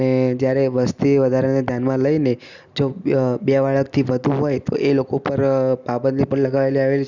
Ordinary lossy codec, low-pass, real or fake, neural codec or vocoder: none; 7.2 kHz; real; none